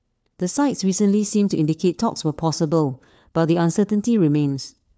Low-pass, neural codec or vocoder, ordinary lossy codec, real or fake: none; codec, 16 kHz, 4 kbps, FunCodec, trained on LibriTTS, 50 frames a second; none; fake